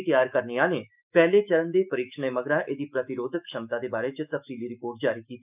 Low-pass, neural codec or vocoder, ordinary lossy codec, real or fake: 3.6 kHz; autoencoder, 48 kHz, 128 numbers a frame, DAC-VAE, trained on Japanese speech; none; fake